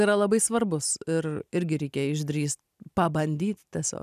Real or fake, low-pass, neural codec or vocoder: real; 14.4 kHz; none